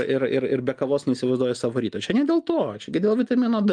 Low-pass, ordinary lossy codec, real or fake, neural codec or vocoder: 9.9 kHz; Opus, 32 kbps; real; none